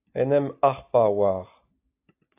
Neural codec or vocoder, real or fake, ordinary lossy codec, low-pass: none; real; AAC, 32 kbps; 3.6 kHz